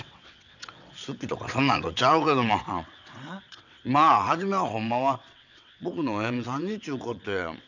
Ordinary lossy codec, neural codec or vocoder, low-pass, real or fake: none; vocoder, 22.05 kHz, 80 mel bands, WaveNeXt; 7.2 kHz; fake